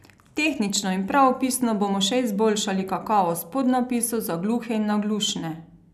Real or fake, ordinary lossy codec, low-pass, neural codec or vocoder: real; none; 14.4 kHz; none